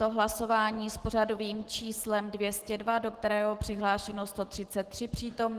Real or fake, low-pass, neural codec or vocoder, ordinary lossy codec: fake; 14.4 kHz; autoencoder, 48 kHz, 128 numbers a frame, DAC-VAE, trained on Japanese speech; Opus, 16 kbps